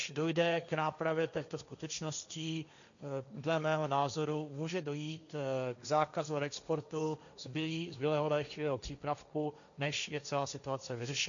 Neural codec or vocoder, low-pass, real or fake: codec, 16 kHz, 1.1 kbps, Voila-Tokenizer; 7.2 kHz; fake